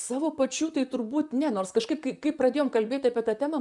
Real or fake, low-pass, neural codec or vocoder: fake; 10.8 kHz; vocoder, 44.1 kHz, 128 mel bands, Pupu-Vocoder